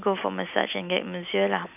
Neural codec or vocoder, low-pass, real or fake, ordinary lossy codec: none; 3.6 kHz; real; none